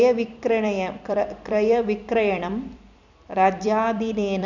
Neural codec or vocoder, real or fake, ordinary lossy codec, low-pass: vocoder, 44.1 kHz, 128 mel bands every 256 samples, BigVGAN v2; fake; none; 7.2 kHz